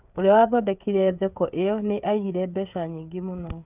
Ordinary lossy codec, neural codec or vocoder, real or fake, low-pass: none; codec, 16 kHz, 8 kbps, FreqCodec, smaller model; fake; 3.6 kHz